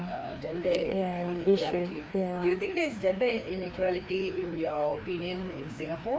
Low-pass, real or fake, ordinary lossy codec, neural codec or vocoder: none; fake; none; codec, 16 kHz, 2 kbps, FreqCodec, larger model